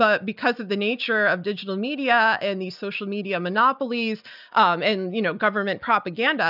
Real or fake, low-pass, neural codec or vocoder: real; 5.4 kHz; none